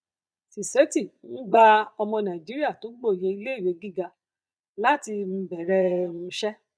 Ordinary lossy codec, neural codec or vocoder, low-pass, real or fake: none; vocoder, 22.05 kHz, 80 mel bands, Vocos; none; fake